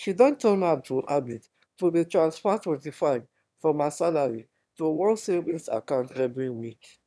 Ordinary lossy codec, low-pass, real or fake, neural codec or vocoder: none; none; fake; autoencoder, 22.05 kHz, a latent of 192 numbers a frame, VITS, trained on one speaker